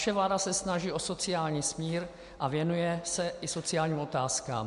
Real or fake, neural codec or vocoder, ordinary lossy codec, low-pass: real; none; MP3, 64 kbps; 10.8 kHz